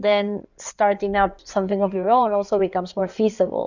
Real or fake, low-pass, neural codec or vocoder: fake; 7.2 kHz; codec, 16 kHz in and 24 kHz out, 2.2 kbps, FireRedTTS-2 codec